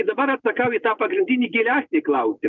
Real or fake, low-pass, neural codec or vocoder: real; 7.2 kHz; none